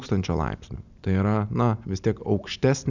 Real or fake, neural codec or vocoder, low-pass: real; none; 7.2 kHz